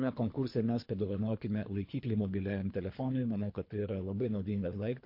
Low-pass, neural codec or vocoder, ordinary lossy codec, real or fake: 5.4 kHz; codec, 24 kHz, 3 kbps, HILCodec; MP3, 32 kbps; fake